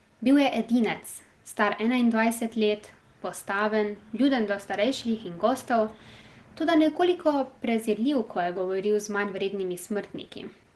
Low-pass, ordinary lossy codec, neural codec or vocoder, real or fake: 10.8 kHz; Opus, 16 kbps; none; real